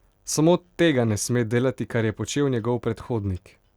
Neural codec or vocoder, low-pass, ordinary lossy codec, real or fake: none; 19.8 kHz; Opus, 64 kbps; real